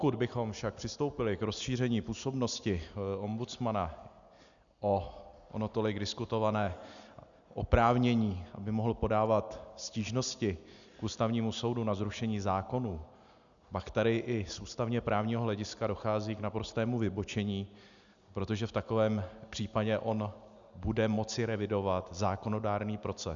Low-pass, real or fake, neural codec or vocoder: 7.2 kHz; real; none